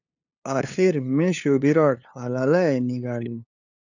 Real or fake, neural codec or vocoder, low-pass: fake; codec, 16 kHz, 2 kbps, FunCodec, trained on LibriTTS, 25 frames a second; 7.2 kHz